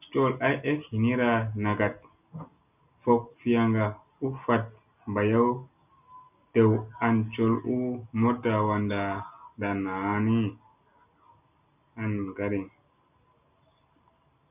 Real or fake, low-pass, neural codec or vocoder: real; 3.6 kHz; none